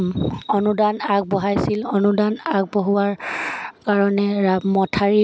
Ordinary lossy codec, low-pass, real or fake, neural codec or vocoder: none; none; real; none